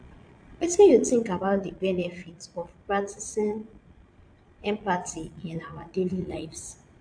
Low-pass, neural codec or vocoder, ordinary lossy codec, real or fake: 9.9 kHz; vocoder, 22.05 kHz, 80 mel bands, Vocos; AAC, 64 kbps; fake